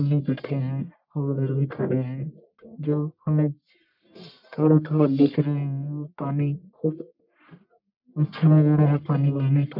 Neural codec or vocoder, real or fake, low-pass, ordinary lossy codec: codec, 44.1 kHz, 1.7 kbps, Pupu-Codec; fake; 5.4 kHz; none